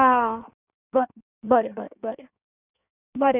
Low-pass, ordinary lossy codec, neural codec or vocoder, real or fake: 3.6 kHz; none; codec, 16 kHz in and 24 kHz out, 1.1 kbps, FireRedTTS-2 codec; fake